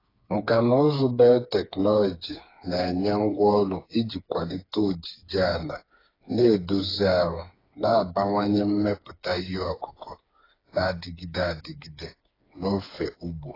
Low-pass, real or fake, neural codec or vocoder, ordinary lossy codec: 5.4 kHz; fake; codec, 16 kHz, 4 kbps, FreqCodec, smaller model; AAC, 24 kbps